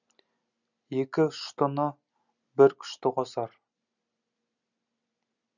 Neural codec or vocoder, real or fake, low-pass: none; real; 7.2 kHz